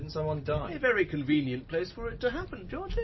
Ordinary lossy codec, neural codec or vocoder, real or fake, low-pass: MP3, 24 kbps; none; real; 7.2 kHz